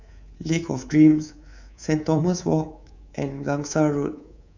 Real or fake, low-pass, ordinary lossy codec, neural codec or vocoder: fake; 7.2 kHz; none; codec, 24 kHz, 3.1 kbps, DualCodec